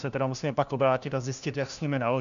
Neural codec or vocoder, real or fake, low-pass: codec, 16 kHz, 1 kbps, FunCodec, trained on LibriTTS, 50 frames a second; fake; 7.2 kHz